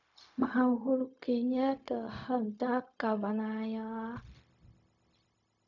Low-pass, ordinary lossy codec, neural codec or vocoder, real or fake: 7.2 kHz; none; codec, 16 kHz, 0.4 kbps, LongCat-Audio-Codec; fake